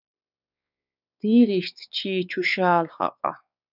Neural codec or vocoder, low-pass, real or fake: codec, 16 kHz, 4 kbps, X-Codec, WavLM features, trained on Multilingual LibriSpeech; 5.4 kHz; fake